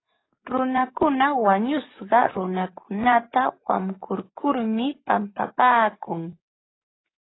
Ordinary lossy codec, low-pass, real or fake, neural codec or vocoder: AAC, 16 kbps; 7.2 kHz; fake; codec, 44.1 kHz, 7.8 kbps, DAC